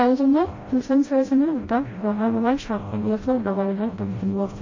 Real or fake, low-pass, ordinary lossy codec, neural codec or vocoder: fake; 7.2 kHz; MP3, 32 kbps; codec, 16 kHz, 0.5 kbps, FreqCodec, smaller model